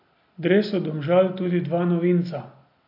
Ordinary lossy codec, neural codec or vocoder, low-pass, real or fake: AAC, 32 kbps; none; 5.4 kHz; real